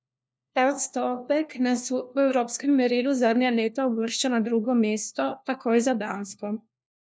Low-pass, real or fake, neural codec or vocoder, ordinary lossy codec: none; fake; codec, 16 kHz, 1 kbps, FunCodec, trained on LibriTTS, 50 frames a second; none